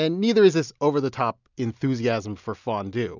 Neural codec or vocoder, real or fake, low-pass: none; real; 7.2 kHz